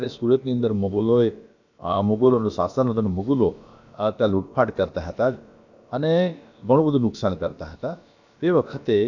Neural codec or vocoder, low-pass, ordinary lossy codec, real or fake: codec, 16 kHz, about 1 kbps, DyCAST, with the encoder's durations; 7.2 kHz; none; fake